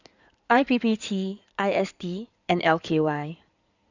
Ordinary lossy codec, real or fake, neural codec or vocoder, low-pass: none; fake; codec, 16 kHz in and 24 kHz out, 2.2 kbps, FireRedTTS-2 codec; 7.2 kHz